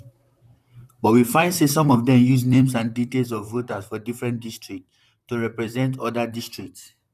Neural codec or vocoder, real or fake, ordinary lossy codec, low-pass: vocoder, 44.1 kHz, 128 mel bands, Pupu-Vocoder; fake; none; 14.4 kHz